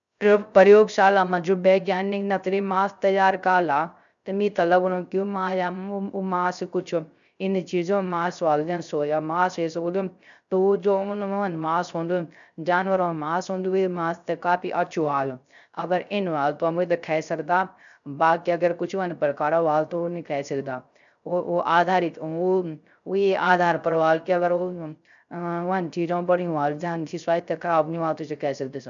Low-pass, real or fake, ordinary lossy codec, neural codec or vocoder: 7.2 kHz; fake; none; codec, 16 kHz, 0.3 kbps, FocalCodec